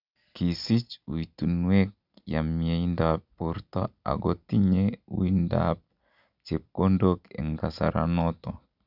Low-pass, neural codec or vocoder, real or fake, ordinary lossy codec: 5.4 kHz; none; real; none